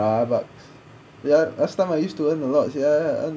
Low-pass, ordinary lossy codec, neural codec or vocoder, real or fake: none; none; none; real